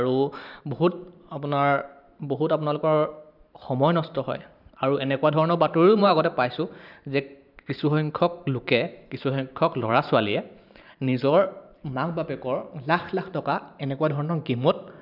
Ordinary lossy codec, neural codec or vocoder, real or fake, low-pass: none; vocoder, 44.1 kHz, 128 mel bands every 512 samples, BigVGAN v2; fake; 5.4 kHz